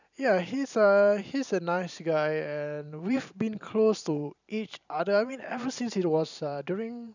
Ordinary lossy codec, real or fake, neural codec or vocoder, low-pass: none; fake; vocoder, 44.1 kHz, 128 mel bands every 512 samples, BigVGAN v2; 7.2 kHz